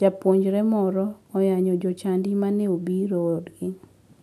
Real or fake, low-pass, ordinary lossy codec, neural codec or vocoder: real; 14.4 kHz; AAC, 96 kbps; none